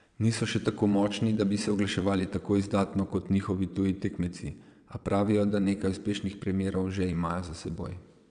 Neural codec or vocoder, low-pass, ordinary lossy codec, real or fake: vocoder, 22.05 kHz, 80 mel bands, WaveNeXt; 9.9 kHz; none; fake